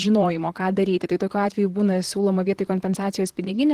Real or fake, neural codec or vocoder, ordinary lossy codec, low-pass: fake; vocoder, 44.1 kHz, 128 mel bands, Pupu-Vocoder; Opus, 16 kbps; 14.4 kHz